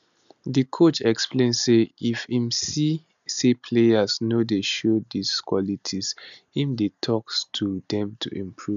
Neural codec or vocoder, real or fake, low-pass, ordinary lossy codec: none; real; 7.2 kHz; none